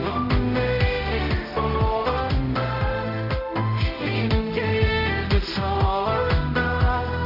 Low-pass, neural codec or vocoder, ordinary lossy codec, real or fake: 5.4 kHz; codec, 16 kHz, 0.5 kbps, X-Codec, HuBERT features, trained on general audio; none; fake